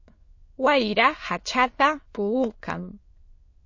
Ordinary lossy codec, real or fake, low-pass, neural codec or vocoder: MP3, 32 kbps; fake; 7.2 kHz; autoencoder, 22.05 kHz, a latent of 192 numbers a frame, VITS, trained on many speakers